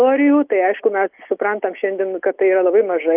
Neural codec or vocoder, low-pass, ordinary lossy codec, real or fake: none; 3.6 kHz; Opus, 32 kbps; real